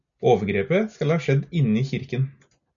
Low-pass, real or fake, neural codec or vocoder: 7.2 kHz; real; none